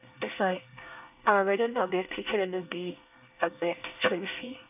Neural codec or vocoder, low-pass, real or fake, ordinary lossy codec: codec, 24 kHz, 1 kbps, SNAC; 3.6 kHz; fake; none